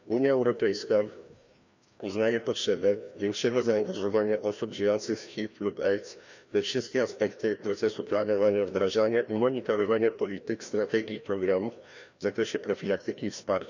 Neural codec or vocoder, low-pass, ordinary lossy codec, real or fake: codec, 16 kHz, 1 kbps, FreqCodec, larger model; 7.2 kHz; none; fake